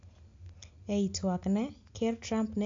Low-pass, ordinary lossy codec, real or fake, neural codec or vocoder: 7.2 kHz; AAC, 48 kbps; real; none